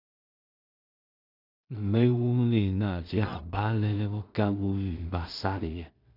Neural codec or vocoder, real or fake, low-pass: codec, 16 kHz in and 24 kHz out, 0.4 kbps, LongCat-Audio-Codec, two codebook decoder; fake; 5.4 kHz